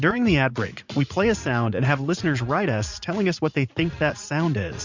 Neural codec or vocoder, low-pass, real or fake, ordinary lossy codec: vocoder, 44.1 kHz, 80 mel bands, Vocos; 7.2 kHz; fake; MP3, 64 kbps